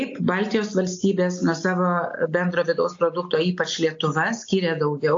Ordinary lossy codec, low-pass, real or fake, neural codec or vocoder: AAC, 48 kbps; 7.2 kHz; real; none